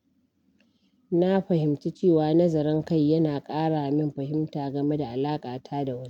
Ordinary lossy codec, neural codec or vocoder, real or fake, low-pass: none; none; real; 19.8 kHz